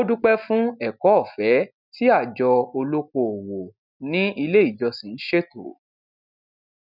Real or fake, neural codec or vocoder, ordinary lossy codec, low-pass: real; none; none; 5.4 kHz